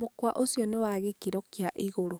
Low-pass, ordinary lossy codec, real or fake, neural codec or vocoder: none; none; fake; codec, 44.1 kHz, 7.8 kbps, DAC